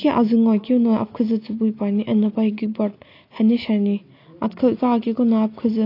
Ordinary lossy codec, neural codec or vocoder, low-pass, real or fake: AAC, 32 kbps; none; 5.4 kHz; real